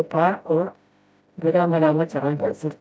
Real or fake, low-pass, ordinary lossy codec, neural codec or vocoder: fake; none; none; codec, 16 kHz, 0.5 kbps, FreqCodec, smaller model